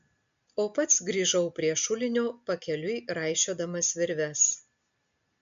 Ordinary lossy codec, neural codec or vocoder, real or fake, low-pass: MP3, 64 kbps; none; real; 7.2 kHz